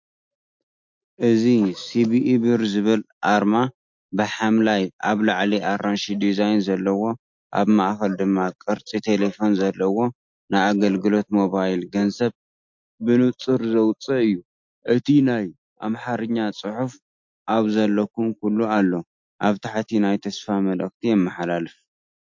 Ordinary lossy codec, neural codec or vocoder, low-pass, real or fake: MP3, 48 kbps; none; 7.2 kHz; real